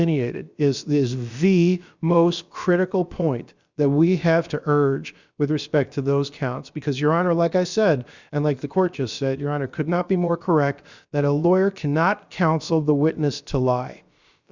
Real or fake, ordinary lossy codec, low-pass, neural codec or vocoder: fake; Opus, 64 kbps; 7.2 kHz; codec, 16 kHz, about 1 kbps, DyCAST, with the encoder's durations